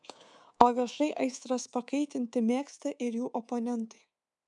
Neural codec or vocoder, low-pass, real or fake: codec, 24 kHz, 3.1 kbps, DualCodec; 10.8 kHz; fake